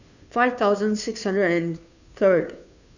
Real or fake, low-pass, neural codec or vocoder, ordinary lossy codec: fake; 7.2 kHz; codec, 16 kHz, 2 kbps, FunCodec, trained on Chinese and English, 25 frames a second; none